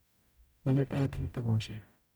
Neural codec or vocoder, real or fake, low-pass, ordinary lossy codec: codec, 44.1 kHz, 0.9 kbps, DAC; fake; none; none